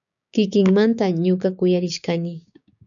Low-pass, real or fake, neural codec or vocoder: 7.2 kHz; fake; codec, 16 kHz, 6 kbps, DAC